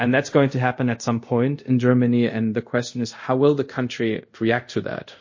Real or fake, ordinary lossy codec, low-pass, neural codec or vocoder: fake; MP3, 32 kbps; 7.2 kHz; codec, 24 kHz, 0.5 kbps, DualCodec